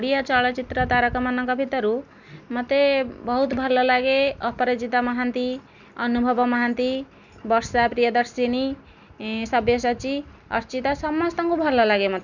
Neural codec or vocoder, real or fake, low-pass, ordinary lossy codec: none; real; 7.2 kHz; none